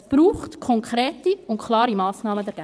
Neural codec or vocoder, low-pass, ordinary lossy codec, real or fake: vocoder, 22.05 kHz, 80 mel bands, WaveNeXt; none; none; fake